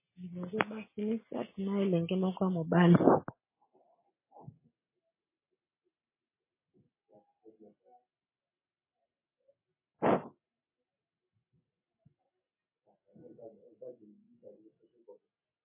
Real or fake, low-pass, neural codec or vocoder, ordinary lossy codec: real; 3.6 kHz; none; MP3, 16 kbps